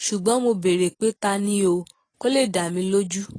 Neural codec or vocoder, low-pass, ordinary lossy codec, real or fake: none; 9.9 kHz; AAC, 32 kbps; real